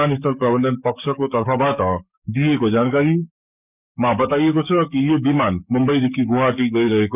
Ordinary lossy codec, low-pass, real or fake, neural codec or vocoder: none; 3.6 kHz; fake; codec, 44.1 kHz, 7.8 kbps, DAC